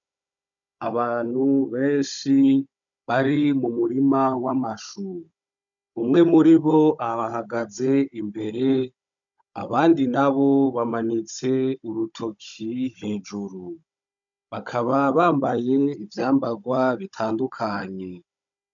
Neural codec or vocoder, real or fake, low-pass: codec, 16 kHz, 16 kbps, FunCodec, trained on Chinese and English, 50 frames a second; fake; 7.2 kHz